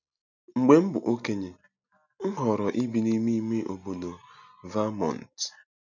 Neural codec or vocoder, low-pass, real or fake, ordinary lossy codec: none; 7.2 kHz; real; none